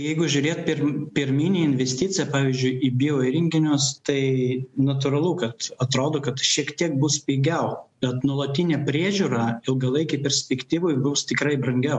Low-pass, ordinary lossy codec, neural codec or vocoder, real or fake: 10.8 kHz; MP3, 64 kbps; none; real